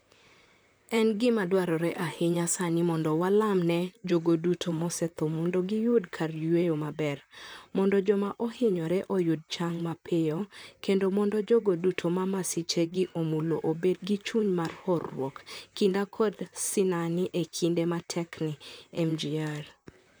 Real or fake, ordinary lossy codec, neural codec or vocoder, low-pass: fake; none; vocoder, 44.1 kHz, 128 mel bands, Pupu-Vocoder; none